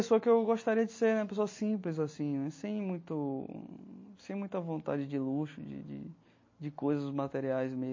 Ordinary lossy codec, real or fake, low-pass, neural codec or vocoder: MP3, 32 kbps; real; 7.2 kHz; none